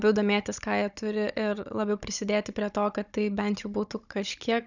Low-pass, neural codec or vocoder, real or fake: 7.2 kHz; codec, 16 kHz, 16 kbps, FunCodec, trained on Chinese and English, 50 frames a second; fake